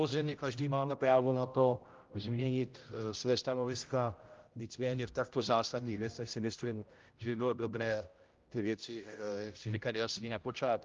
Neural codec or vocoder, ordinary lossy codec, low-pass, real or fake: codec, 16 kHz, 0.5 kbps, X-Codec, HuBERT features, trained on general audio; Opus, 32 kbps; 7.2 kHz; fake